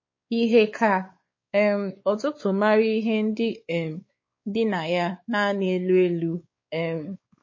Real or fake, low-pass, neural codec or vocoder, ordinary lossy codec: fake; 7.2 kHz; codec, 16 kHz, 4 kbps, X-Codec, WavLM features, trained on Multilingual LibriSpeech; MP3, 32 kbps